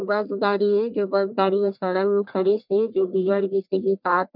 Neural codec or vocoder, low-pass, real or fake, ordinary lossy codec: codec, 44.1 kHz, 1.7 kbps, Pupu-Codec; 5.4 kHz; fake; none